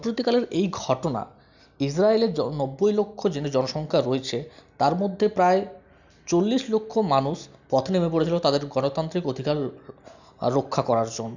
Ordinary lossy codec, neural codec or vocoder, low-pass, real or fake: none; none; 7.2 kHz; real